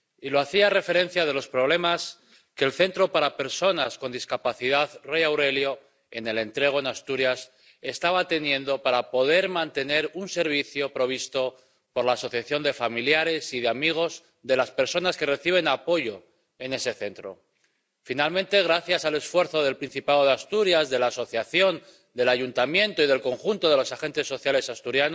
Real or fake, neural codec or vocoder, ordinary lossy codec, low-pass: real; none; none; none